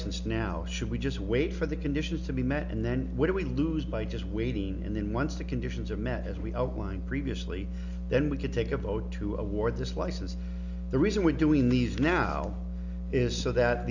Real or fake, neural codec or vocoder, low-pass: real; none; 7.2 kHz